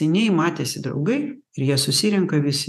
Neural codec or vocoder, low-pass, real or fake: vocoder, 48 kHz, 128 mel bands, Vocos; 14.4 kHz; fake